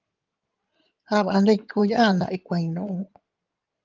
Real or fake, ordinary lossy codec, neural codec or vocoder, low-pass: fake; Opus, 32 kbps; codec, 16 kHz in and 24 kHz out, 2.2 kbps, FireRedTTS-2 codec; 7.2 kHz